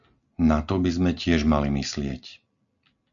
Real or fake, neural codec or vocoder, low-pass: real; none; 7.2 kHz